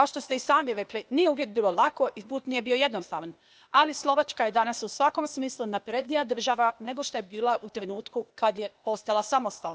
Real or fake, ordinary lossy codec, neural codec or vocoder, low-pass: fake; none; codec, 16 kHz, 0.8 kbps, ZipCodec; none